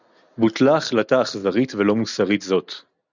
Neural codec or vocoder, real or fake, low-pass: none; real; 7.2 kHz